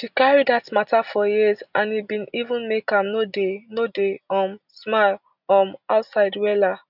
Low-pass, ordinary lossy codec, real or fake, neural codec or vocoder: 5.4 kHz; none; real; none